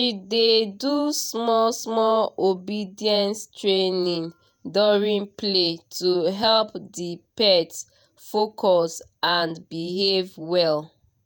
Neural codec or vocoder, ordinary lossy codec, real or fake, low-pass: vocoder, 48 kHz, 128 mel bands, Vocos; none; fake; none